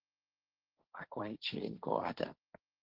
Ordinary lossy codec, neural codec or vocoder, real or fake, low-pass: Opus, 16 kbps; codec, 16 kHz, 1.1 kbps, Voila-Tokenizer; fake; 5.4 kHz